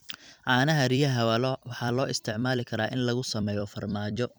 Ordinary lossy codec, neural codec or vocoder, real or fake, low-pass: none; vocoder, 44.1 kHz, 128 mel bands every 256 samples, BigVGAN v2; fake; none